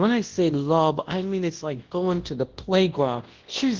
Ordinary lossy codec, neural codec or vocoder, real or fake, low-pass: Opus, 16 kbps; codec, 24 kHz, 0.9 kbps, WavTokenizer, large speech release; fake; 7.2 kHz